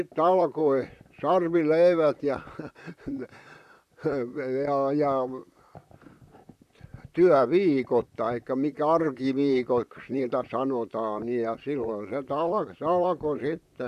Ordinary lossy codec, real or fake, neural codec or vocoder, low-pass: none; fake; vocoder, 44.1 kHz, 128 mel bands, Pupu-Vocoder; 14.4 kHz